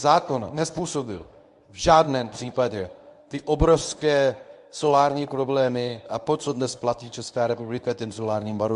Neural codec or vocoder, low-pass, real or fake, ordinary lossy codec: codec, 24 kHz, 0.9 kbps, WavTokenizer, medium speech release version 1; 10.8 kHz; fake; AAC, 96 kbps